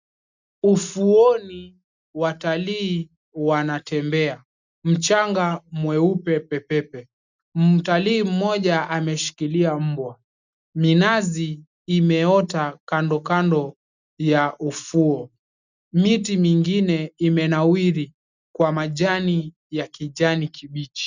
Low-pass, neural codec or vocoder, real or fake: 7.2 kHz; none; real